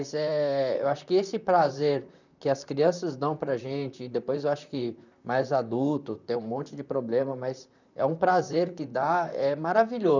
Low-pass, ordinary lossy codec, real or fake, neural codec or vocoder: 7.2 kHz; none; fake; vocoder, 44.1 kHz, 128 mel bands, Pupu-Vocoder